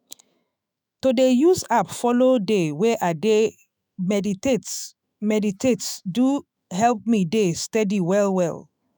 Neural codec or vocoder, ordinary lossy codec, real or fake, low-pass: autoencoder, 48 kHz, 128 numbers a frame, DAC-VAE, trained on Japanese speech; none; fake; none